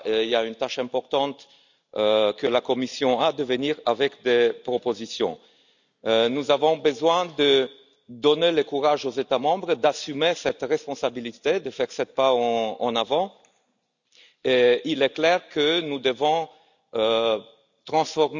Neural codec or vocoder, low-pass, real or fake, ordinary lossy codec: none; 7.2 kHz; real; none